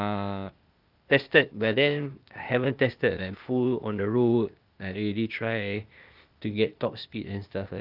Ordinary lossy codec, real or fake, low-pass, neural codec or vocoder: Opus, 24 kbps; fake; 5.4 kHz; codec, 16 kHz, 0.8 kbps, ZipCodec